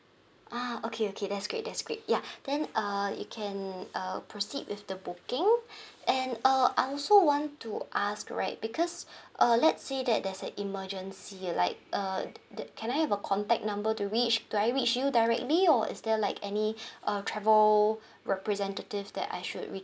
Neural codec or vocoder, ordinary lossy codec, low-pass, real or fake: none; none; none; real